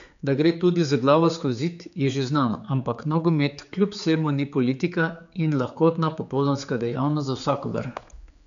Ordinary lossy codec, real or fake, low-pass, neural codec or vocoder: none; fake; 7.2 kHz; codec, 16 kHz, 4 kbps, X-Codec, HuBERT features, trained on general audio